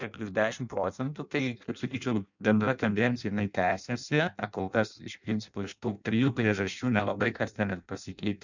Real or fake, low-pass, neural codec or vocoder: fake; 7.2 kHz; codec, 16 kHz in and 24 kHz out, 0.6 kbps, FireRedTTS-2 codec